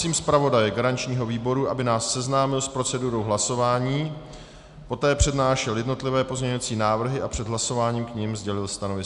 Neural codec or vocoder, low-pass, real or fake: none; 10.8 kHz; real